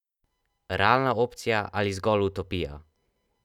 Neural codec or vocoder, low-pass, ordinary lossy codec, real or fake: none; 19.8 kHz; none; real